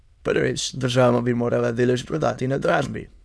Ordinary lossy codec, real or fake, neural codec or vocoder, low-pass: none; fake; autoencoder, 22.05 kHz, a latent of 192 numbers a frame, VITS, trained on many speakers; none